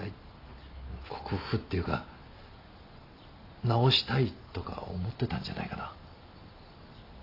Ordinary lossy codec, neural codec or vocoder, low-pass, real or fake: AAC, 32 kbps; none; 5.4 kHz; real